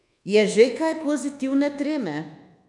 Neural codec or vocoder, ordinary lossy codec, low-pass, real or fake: codec, 24 kHz, 1.2 kbps, DualCodec; none; 10.8 kHz; fake